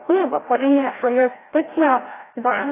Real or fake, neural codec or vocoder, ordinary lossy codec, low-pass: fake; codec, 16 kHz, 0.5 kbps, FreqCodec, larger model; AAC, 16 kbps; 3.6 kHz